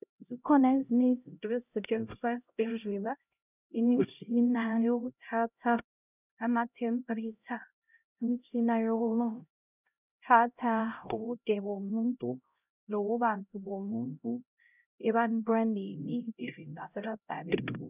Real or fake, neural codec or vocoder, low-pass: fake; codec, 16 kHz, 0.5 kbps, X-Codec, HuBERT features, trained on LibriSpeech; 3.6 kHz